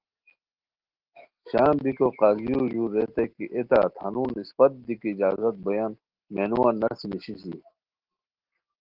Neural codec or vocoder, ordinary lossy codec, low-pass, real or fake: none; Opus, 16 kbps; 5.4 kHz; real